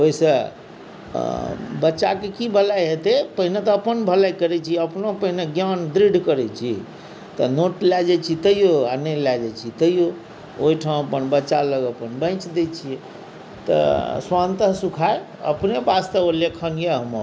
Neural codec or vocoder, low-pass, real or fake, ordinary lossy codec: none; none; real; none